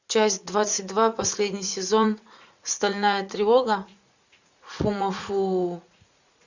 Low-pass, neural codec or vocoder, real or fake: 7.2 kHz; vocoder, 44.1 kHz, 80 mel bands, Vocos; fake